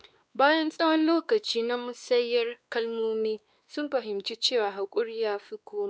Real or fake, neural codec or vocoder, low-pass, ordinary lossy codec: fake; codec, 16 kHz, 2 kbps, X-Codec, WavLM features, trained on Multilingual LibriSpeech; none; none